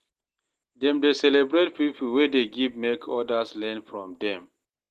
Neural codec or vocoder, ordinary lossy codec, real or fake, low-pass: none; Opus, 16 kbps; real; 14.4 kHz